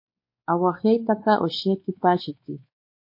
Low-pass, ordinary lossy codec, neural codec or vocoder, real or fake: 5.4 kHz; MP3, 32 kbps; codec, 16 kHz, 4 kbps, X-Codec, WavLM features, trained on Multilingual LibriSpeech; fake